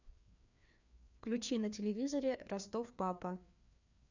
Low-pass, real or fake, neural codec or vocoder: 7.2 kHz; fake; codec, 16 kHz, 2 kbps, FreqCodec, larger model